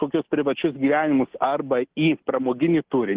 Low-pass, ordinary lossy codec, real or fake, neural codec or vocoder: 3.6 kHz; Opus, 16 kbps; real; none